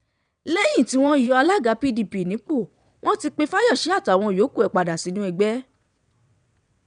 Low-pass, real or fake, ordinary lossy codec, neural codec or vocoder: 9.9 kHz; fake; none; vocoder, 22.05 kHz, 80 mel bands, WaveNeXt